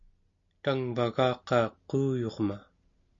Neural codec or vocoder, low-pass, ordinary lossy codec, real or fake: none; 7.2 kHz; MP3, 48 kbps; real